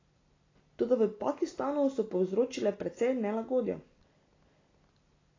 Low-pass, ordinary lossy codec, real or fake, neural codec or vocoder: 7.2 kHz; AAC, 32 kbps; real; none